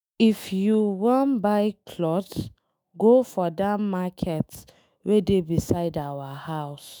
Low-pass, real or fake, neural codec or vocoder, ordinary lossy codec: none; fake; autoencoder, 48 kHz, 128 numbers a frame, DAC-VAE, trained on Japanese speech; none